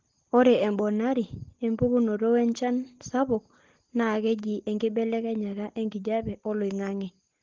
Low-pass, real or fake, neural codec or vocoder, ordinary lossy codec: 7.2 kHz; real; none; Opus, 16 kbps